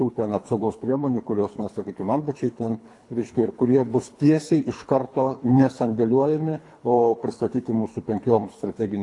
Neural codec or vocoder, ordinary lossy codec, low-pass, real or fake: codec, 24 kHz, 3 kbps, HILCodec; AAC, 48 kbps; 10.8 kHz; fake